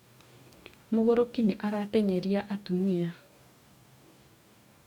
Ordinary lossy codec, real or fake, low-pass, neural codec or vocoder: none; fake; 19.8 kHz; codec, 44.1 kHz, 2.6 kbps, DAC